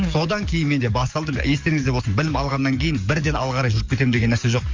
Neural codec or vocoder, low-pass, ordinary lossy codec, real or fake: codec, 44.1 kHz, 7.8 kbps, DAC; 7.2 kHz; Opus, 32 kbps; fake